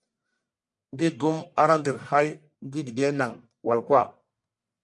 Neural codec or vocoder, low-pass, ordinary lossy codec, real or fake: codec, 44.1 kHz, 1.7 kbps, Pupu-Codec; 10.8 kHz; MP3, 64 kbps; fake